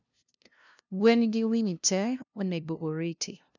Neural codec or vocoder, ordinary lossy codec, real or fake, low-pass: codec, 16 kHz, 0.5 kbps, FunCodec, trained on LibriTTS, 25 frames a second; none; fake; 7.2 kHz